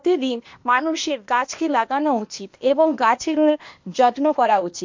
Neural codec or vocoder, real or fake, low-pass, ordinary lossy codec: codec, 16 kHz, 0.8 kbps, ZipCodec; fake; 7.2 kHz; MP3, 48 kbps